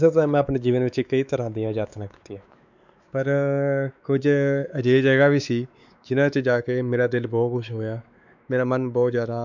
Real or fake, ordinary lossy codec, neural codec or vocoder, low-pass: fake; none; codec, 16 kHz, 4 kbps, X-Codec, WavLM features, trained on Multilingual LibriSpeech; 7.2 kHz